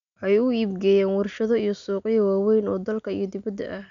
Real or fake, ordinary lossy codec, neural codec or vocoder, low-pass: real; none; none; 7.2 kHz